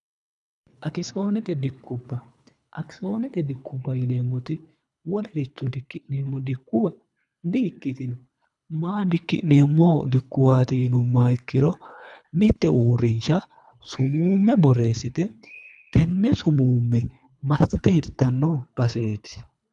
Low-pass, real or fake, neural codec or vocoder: 10.8 kHz; fake; codec, 24 kHz, 3 kbps, HILCodec